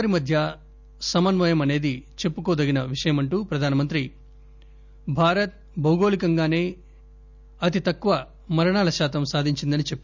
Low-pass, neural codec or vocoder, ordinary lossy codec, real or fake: 7.2 kHz; none; none; real